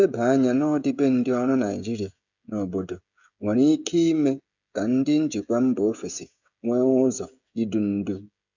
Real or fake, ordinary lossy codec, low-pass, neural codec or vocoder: fake; none; 7.2 kHz; codec, 16 kHz, 16 kbps, FreqCodec, smaller model